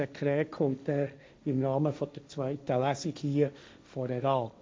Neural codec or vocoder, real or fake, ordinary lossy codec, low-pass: codec, 16 kHz, 1.1 kbps, Voila-Tokenizer; fake; none; none